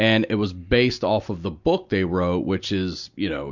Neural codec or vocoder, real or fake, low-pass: none; real; 7.2 kHz